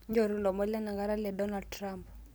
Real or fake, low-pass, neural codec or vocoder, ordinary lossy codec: real; none; none; none